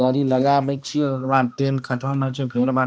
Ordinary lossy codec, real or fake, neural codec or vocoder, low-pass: none; fake; codec, 16 kHz, 1 kbps, X-Codec, HuBERT features, trained on balanced general audio; none